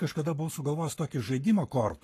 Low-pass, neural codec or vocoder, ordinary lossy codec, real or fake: 14.4 kHz; codec, 44.1 kHz, 7.8 kbps, Pupu-Codec; AAC, 48 kbps; fake